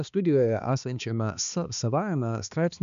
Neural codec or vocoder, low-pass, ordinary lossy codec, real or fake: codec, 16 kHz, 2 kbps, X-Codec, HuBERT features, trained on balanced general audio; 7.2 kHz; MP3, 96 kbps; fake